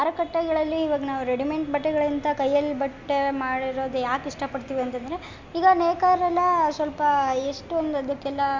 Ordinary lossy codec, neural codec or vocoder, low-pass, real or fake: MP3, 48 kbps; none; 7.2 kHz; real